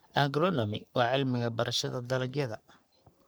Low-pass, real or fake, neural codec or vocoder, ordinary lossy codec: none; fake; codec, 44.1 kHz, 3.4 kbps, Pupu-Codec; none